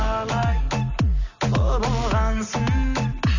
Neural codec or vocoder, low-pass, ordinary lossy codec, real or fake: none; 7.2 kHz; none; real